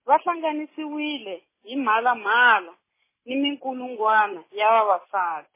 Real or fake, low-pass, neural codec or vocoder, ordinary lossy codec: real; 3.6 kHz; none; MP3, 16 kbps